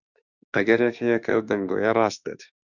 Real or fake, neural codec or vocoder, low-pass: fake; autoencoder, 48 kHz, 32 numbers a frame, DAC-VAE, trained on Japanese speech; 7.2 kHz